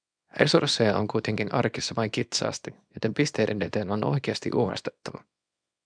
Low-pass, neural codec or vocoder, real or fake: 9.9 kHz; codec, 24 kHz, 0.9 kbps, WavTokenizer, small release; fake